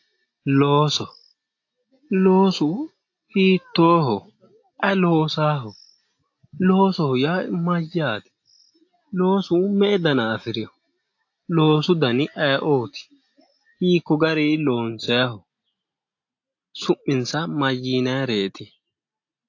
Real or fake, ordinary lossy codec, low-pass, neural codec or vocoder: real; AAC, 48 kbps; 7.2 kHz; none